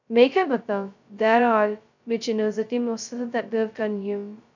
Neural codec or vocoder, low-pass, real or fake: codec, 16 kHz, 0.2 kbps, FocalCodec; 7.2 kHz; fake